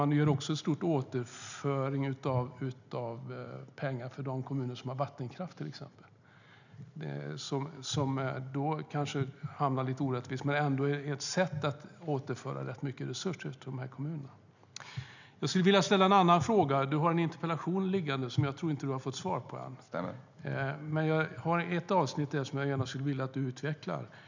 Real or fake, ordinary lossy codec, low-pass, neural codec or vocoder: real; none; 7.2 kHz; none